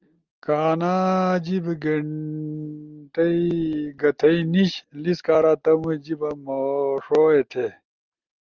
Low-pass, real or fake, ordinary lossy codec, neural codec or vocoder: 7.2 kHz; real; Opus, 24 kbps; none